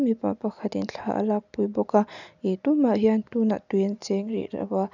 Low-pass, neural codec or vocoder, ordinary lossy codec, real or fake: 7.2 kHz; none; none; real